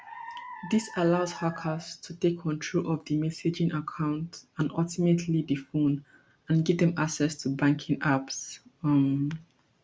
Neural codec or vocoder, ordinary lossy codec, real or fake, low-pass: none; none; real; none